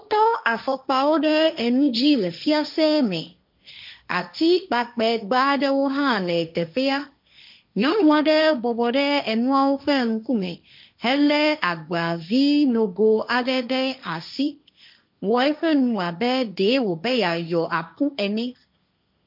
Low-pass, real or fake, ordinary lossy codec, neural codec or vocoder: 5.4 kHz; fake; MP3, 48 kbps; codec, 16 kHz, 1.1 kbps, Voila-Tokenizer